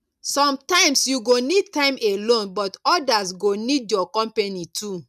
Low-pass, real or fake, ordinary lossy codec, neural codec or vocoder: 14.4 kHz; real; none; none